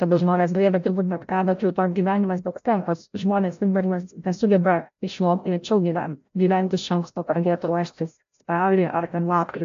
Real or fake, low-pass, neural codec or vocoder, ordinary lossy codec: fake; 7.2 kHz; codec, 16 kHz, 0.5 kbps, FreqCodec, larger model; AAC, 64 kbps